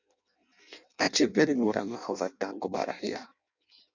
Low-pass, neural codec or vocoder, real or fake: 7.2 kHz; codec, 16 kHz in and 24 kHz out, 0.6 kbps, FireRedTTS-2 codec; fake